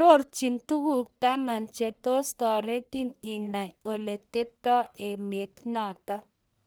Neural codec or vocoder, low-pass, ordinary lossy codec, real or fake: codec, 44.1 kHz, 1.7 kbps, Pupu-Codec; none; none; fake